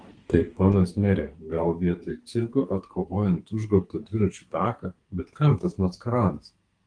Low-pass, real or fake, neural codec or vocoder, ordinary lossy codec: 9.9 kHz; fake; codec, 32 kHz, 1.9 kbps, SNAC; Opus, 24 kbps